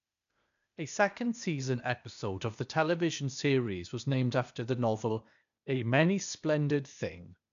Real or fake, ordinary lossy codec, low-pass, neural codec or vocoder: fake; none; 7.2 kHz; codec, 16 kHz, 0.8 kbps, ZipCodec